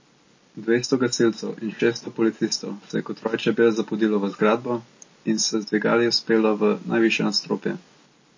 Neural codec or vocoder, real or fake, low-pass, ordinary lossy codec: none; real; 7.2 kHz; MP3, 32 kbps